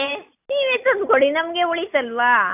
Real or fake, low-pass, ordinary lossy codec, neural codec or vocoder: real; 3.6 kHz; none; none